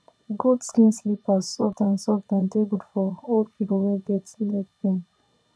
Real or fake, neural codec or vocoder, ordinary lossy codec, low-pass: fake; vocoder, 22.05 kHz, 80 mel bands, Vocos; none; none